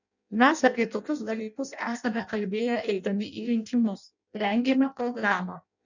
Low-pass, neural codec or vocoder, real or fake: 7.2 kHz; codec, 16 kHz in and 24 kHz out, 0.6 kbps, FireRedTTS-2 codec; fake